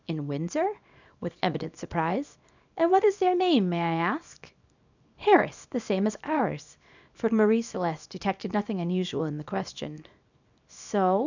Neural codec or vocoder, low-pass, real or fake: codec, 24 kHz, 0.9 kbps, WavTokenizer, small release; 7.2 kHz; fake